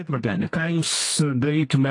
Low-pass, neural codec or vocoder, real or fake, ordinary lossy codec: 10.8 kHz; codec, 24 kHz, 0.9 kbps, WavTokenizer, medium music audio release; fake; AAC, 48 kbps